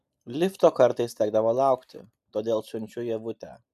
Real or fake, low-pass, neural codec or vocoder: real; 14.4 kHz; none